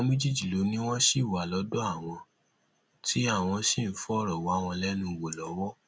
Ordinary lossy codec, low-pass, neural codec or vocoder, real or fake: none; none; none; real